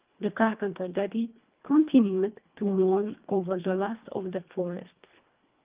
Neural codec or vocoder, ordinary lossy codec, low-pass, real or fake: codec, 24 kHz, 1.5 kbps, HILCodec; Opus, 32 kbps; 3.6 kHz; fake